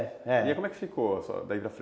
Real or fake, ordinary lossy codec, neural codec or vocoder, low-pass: real; none; none; none